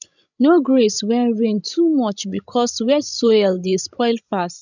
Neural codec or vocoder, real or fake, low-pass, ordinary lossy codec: codec, 16 kHz, 16 kbps, FreqCodec, larger model; fake; 7.2 kHz; none